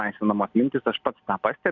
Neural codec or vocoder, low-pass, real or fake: none; 7.2 kHz; real